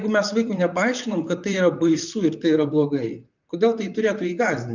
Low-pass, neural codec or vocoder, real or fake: 7.2 kHz; vocoder, 44.1 kHz, 128 mel bands, Pupu-Vocoder; fake